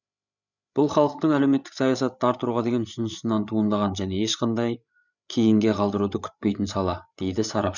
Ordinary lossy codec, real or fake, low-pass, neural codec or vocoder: none; fake; 7.2 kHz; codec, 16 kHz, 8 kbps, FreqCodec, larger model